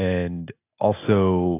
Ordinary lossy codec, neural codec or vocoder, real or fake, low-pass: AAC, 16 kbps; none; real; 3.6 kHz